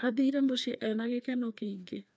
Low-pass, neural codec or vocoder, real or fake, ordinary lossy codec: none; codec, 16 kHz, 2 kbps, FreqCodec, larger model; fake; none